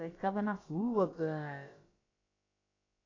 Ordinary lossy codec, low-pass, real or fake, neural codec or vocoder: AAC, 32 kbps; 7.2 kHz; fake; codec, 16 kHz, about 1 kbps, DyCAST, with the encoder's durations